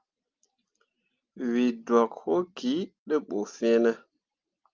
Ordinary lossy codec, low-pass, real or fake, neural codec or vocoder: Opus, 24 kbps; 7.2 kHz; real; none